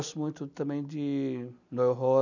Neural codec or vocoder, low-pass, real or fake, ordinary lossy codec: none; 7.2 kHz; real; none